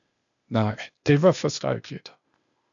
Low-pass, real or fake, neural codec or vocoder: 7.2 kHz; fake; codec, 16 kHz, 0.8 kbps, ZipCodec